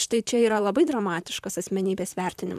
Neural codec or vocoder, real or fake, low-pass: vocoder, 44.1 kHz, 128 mel bands, Pupu-Vocoder; fake; 14.4 kHz